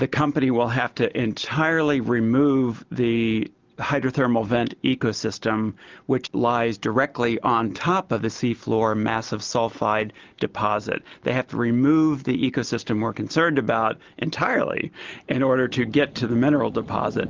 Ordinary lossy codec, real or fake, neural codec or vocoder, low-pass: Opus, 32 kbps; real; none; 7.2 kHz